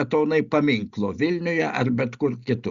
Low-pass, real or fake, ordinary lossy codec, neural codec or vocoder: 7.2 kHz; real; Opus, 64 kbps; none